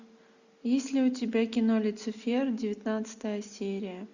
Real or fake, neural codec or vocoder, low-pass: real; none; 7.2 kHz